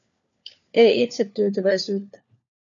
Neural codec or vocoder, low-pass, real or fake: codec, 16 kHz, 4 kbps, FunCodec, trained on LibriTTS, 50 frames a second; 7.2 kHz; fake